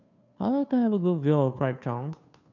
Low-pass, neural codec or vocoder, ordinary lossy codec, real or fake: 7.2 kHz; codec, 16 kHz, 2 kbps, FunCodec, trained on Chinese and English, 25 frames a second; none; fake